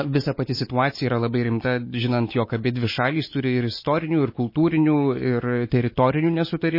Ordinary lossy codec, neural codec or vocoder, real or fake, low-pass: MP3, 24 kbps; none; real; 5.4 kHz